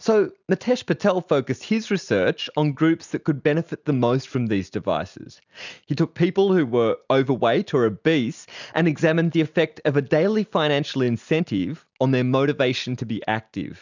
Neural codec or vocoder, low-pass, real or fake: none; 7.2 kHz; real